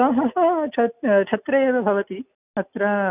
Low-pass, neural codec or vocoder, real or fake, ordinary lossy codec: 3.6 kHz; none; real; none